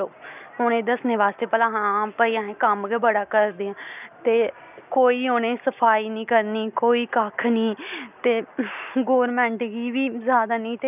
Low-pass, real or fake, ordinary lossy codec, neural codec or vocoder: 3.6 kHz; real; none; none